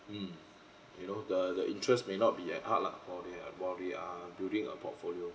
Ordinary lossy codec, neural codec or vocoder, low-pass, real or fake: none; none; none; real